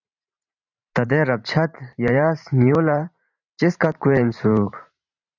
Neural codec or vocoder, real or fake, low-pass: vocoder, 44.1 kHz, 128 mel bands every 512 samples, BigVGAN v2; fake; 7.2 kHz